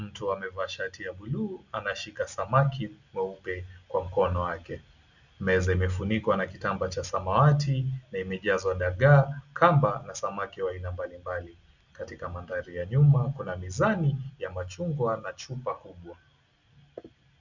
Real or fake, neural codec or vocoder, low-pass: real; none; 7.2 kHz